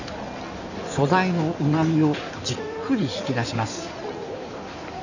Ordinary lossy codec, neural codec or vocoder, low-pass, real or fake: none; codec, 16 kHz in and 24 kHz out, 2.2 kbps, FireRedTTS-2 codec; 7.2 kHz; fake